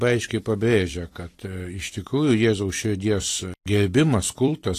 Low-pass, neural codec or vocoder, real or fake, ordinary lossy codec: 14.4 kHz; vocoder, 44.1 kHz, 128 mel bands every 256 samples, BigVGAN v2; fake; AAC, 48 kbps